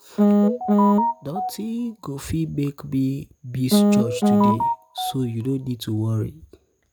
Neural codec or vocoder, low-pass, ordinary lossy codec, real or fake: none; none; none; real